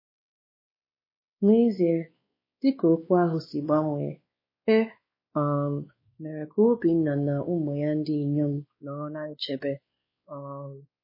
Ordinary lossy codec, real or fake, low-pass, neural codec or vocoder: MP3, 24 kbps; fake; 5.4 kHz; codec, 16 kHz, 2 kbps, X-Codec, WavLM features, trained on Multilingual LibriSpeech